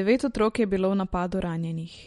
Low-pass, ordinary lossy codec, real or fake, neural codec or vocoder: 19.8 kHz; MP3, 48 kbps; real; none